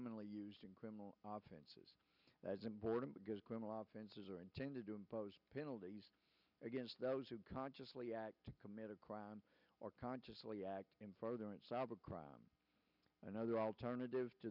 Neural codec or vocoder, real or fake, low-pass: none; real; 5.4 kHz